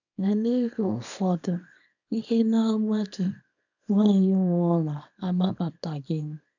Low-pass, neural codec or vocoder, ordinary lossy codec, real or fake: 7.2 kHz; codec, 24 kHz, 0.9 kbps, WavTokenizer, small release; none; fake